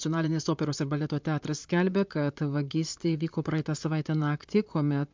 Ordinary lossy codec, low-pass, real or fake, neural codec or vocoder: MP3, 64 kbps; 7.2 kHz; fake; vocoder, 44.1 kHz, 128 mel bands, Pupu-Vocoder